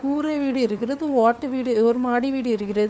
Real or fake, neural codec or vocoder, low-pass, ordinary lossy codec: fake; codec, 16 kHz, 4 kbps, FunCodec, trained on LibriTTS, 50 frames a second; none; none